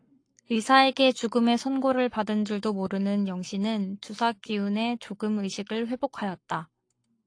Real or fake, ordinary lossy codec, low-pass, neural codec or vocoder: fake; AAC, 48 kbps; 9.9 kHz; codec, 44.1 kHz, 7.8 kbps, Pupu-Codec